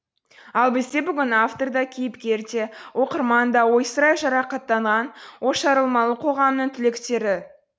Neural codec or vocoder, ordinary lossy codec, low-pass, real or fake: none; none; none; real